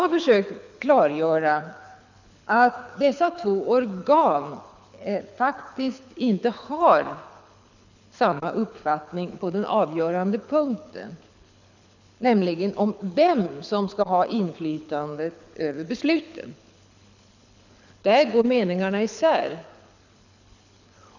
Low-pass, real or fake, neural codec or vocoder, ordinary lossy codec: 7.2 kHz; fake; codec, 24 kHz, 6 kbps, HILCodec; none